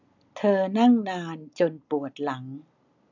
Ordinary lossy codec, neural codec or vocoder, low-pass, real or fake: none; none; 7.2 kHz; real